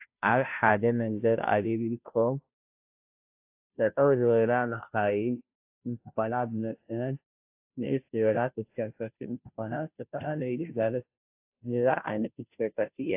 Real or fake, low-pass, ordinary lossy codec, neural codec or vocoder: fake; 3.6 kHz; AAC, 32 kbps; codec, 16 kHz, 0.5 kbps, FunCodec, trained on Chinese and English, 25 frames a second